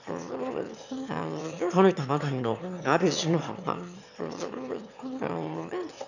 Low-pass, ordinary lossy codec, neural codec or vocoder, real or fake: 7.2 kHz; none; autoencoder, 22.05 kHz, a latent of 192 numbers a frame, VITS, trained on one speaker; fake